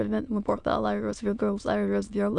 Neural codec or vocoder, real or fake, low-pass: autoencoder, 22.05 kHz, a latent of 192 numbers a frame, VITS, trained on many speakers; fake; 9.9 kHz